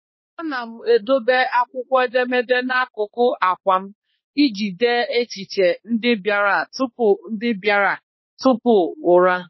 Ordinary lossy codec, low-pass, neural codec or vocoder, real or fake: MP3, 24 kbps; 7.2 kHz; codec, 16 kHz, 2 kbps, X-Codec, HuBERT features, trained on balanced general audio; fake